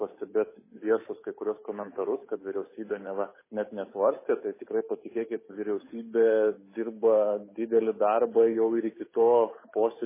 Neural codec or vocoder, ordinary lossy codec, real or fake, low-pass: codec, 16 kHz, 8 kbps, FunCodec, trained on Chinese and English, 25 frames a second; MP3, 16 kbps; fake; 3.6 kHz